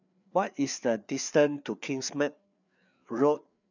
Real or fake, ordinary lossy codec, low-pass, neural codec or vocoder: fake; none; 7.2 kHz; codec, 16 kHz, 4 kbps, FreqCodec, larger model